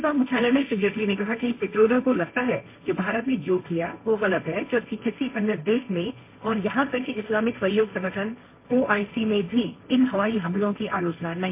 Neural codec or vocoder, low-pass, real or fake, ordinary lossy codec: codec, 16 kHz, 1.1 kbps, Voila-Tokenizer; 3.6 kHz; fake; MP3, 24 kbps